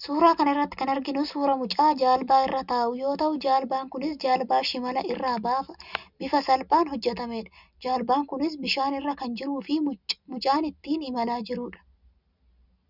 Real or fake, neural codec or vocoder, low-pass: real; none; 5.4 kHz